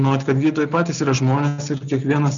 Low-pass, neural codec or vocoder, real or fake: 7.2 kHz; none; real